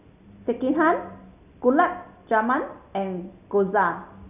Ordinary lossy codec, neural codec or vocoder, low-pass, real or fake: none; none; 3.6 kHz; real